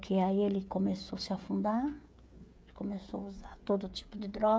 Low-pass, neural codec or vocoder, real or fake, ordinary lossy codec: none; codec, 16 kHz, 16 kbps, FreqCodec, smaller model; fake; none